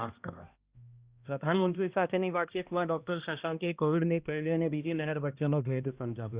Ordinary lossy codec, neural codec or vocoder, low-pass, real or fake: Opus, 64 kbps; codec, 16 kHz, 1 kbps, X-Codec, HuBERT features, trained on balanced general audio; 3.6 kHz; fake